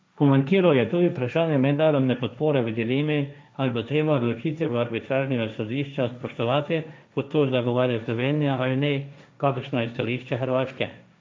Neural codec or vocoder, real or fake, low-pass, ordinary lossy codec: codec, 16 kHz, 1.1 kbps, Voila-Tokenizer; fake; none; none